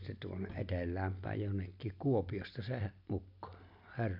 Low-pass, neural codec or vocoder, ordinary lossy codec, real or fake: 5.4 kHz; none; none; real